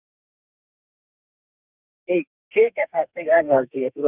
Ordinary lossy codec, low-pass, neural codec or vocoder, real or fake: none; 3.6 kHz; codec, 32 kHz, 1.9 kbps, SNAC; fake